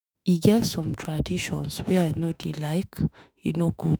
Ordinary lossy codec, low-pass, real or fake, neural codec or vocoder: none; none; fake; autoencoder, 48 kHz, 32 numbers a frame, DAC-VAE, trained on Japanese speech